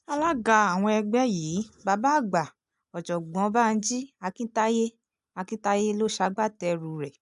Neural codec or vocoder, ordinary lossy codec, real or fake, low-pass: none; AAC, 96 kbps; real; 10.8 kHz